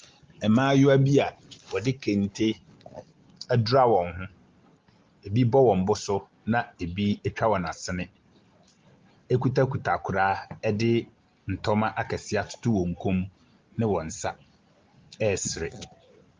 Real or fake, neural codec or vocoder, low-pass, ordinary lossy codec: real; none; 7.2 kHz; Opus, 32 kbps